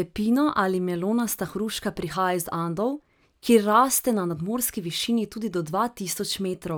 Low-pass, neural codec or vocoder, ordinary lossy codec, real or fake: none; none; none; real